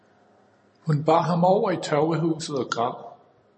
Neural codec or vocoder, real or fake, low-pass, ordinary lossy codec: none; real; 10.8 kHz; MP3, 32 kbps